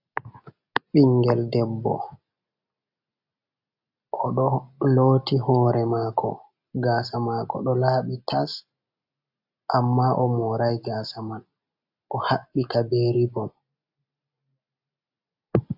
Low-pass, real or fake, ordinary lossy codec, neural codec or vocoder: 5.4 kHz; real; AAC, 48 kbps; none